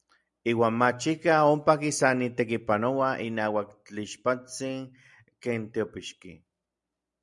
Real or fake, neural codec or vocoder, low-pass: real; none; 10.8 kHz